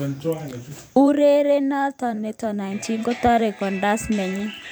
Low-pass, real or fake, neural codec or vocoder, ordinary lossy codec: none; fake; vocoder, 44.1 kHz, 128 mel bands every 512 samples, BigVGAN v2; none